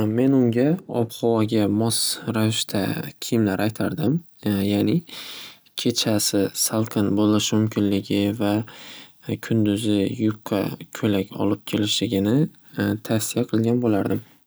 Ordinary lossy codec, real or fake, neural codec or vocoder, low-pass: none; real; none; none